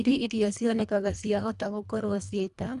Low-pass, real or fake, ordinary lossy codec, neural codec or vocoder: 10.8 kHz; fake; none; codec, 24 kHz, 1.5 kbps, HILCodec